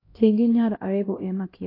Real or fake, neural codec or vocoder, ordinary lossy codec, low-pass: fake; codec, 16 kHz, 1 kbps, X-Codec, HuBERT features, trained on LibriSpeech; AAC, 24 kbps; 5.4 kHz